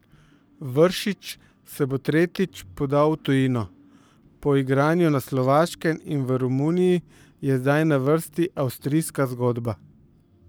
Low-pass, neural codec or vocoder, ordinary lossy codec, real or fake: none; codec, 44.1 kHz, 7.8 kbps, Pupu-Codec; none; fake